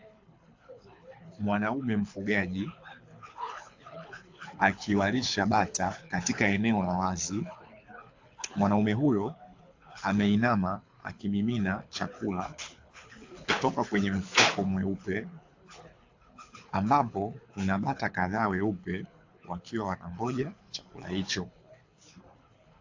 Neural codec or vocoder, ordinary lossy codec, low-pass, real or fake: codec, 24 kHz, 6 kbps, HILCodec; AAC, 48 kbps; 7.2 kHz; fake